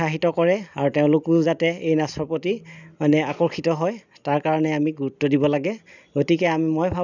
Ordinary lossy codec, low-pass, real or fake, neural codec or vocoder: none; 7.2 kHz; real; none